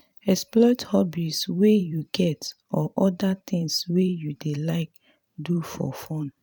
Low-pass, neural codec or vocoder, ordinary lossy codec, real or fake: 19.8 kHz; vocoder, 44.1 kHz, 128 mel bands every 512 samples, BigVGAN v2; none; fake